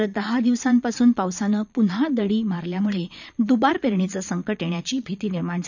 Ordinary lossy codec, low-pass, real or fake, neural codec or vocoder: none; 7.2 kHz; fake; vocoder, 22.05 kHz, 80 mel bands, Vocos